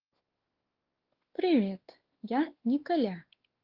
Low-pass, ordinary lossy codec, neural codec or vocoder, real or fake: 5.4 kHz; Opus, 16 kbps; codec, 16 kHz, 2 kbps, X-Codec, WavLM features, trained on Multilingual LibriSpeech; fake